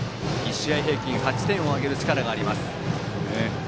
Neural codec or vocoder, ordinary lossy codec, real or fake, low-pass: none; none; real; none